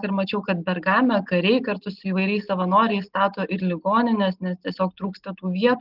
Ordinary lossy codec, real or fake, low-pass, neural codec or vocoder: Opus, 32 kbps; real; 5.4 kHz; none